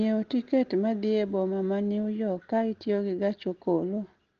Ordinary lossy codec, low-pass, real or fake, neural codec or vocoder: Opus, 16 kbps; 7.2 kHz; real; none